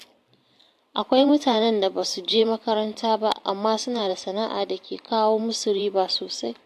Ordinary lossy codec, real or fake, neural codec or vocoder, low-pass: AAC, 64 kbps; fake; vocoder, 44.1 kHz, 128 mel bands every 512 samples, BigVGAN v2; 14.4 kHz